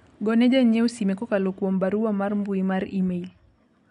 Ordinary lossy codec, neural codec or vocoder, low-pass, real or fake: none; none; 10.8 kHz; real